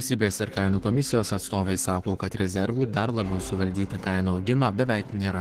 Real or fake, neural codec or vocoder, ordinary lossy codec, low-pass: fake; codec, 32 kHz, 1.9 kbps, SNAC; Opus, 16 kbps; 14.4 kHz